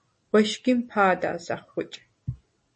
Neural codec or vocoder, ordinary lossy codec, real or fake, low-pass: none; MP3, 32 kbps; real; 10.8 kHz